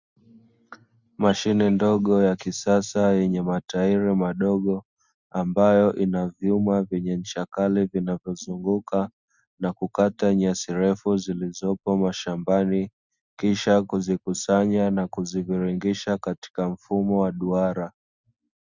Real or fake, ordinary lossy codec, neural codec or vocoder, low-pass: real; Opus, 64 kbps; none; 7.2 kHz